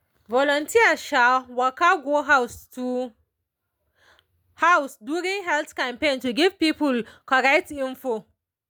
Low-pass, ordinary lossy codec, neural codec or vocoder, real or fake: none; none; none; real